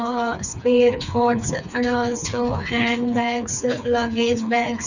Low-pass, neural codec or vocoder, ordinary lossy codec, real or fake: 7.2 kHz; codec, 16 kHz, 4 kbps, FreqCodec, smaller model; none; fake